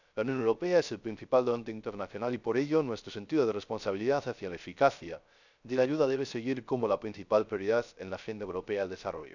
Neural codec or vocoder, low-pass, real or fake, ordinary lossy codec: codec, 16 kHz, 0.3 kbps, FocalCodec; 7.2 kHz; fake; none